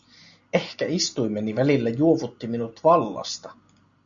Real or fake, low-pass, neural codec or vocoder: real; 7.2 kHz; none